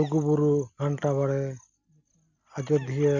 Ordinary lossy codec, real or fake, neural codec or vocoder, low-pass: none; real; none; 7.2 kHz